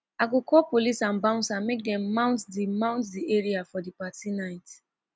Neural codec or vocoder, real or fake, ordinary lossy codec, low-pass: none; real; none; none